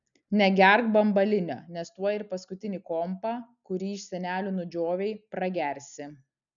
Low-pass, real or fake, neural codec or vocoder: 7.2 kHz; real; none